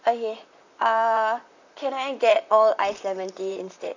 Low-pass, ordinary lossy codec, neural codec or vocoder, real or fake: 7.2 kHz; none; vocoder, 44.1 kHz, 128 mel bands, Pupu-Vocoder; fake